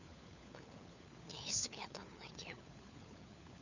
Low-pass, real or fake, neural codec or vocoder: 7.2 kHz; fake; codec, 16 kHz, 4 kbps, FunCodec, trained on LibriTTS, 50 frames a second